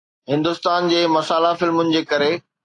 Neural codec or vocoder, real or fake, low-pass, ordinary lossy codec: none; real; 10.8 kHz; AAC, 48 kbps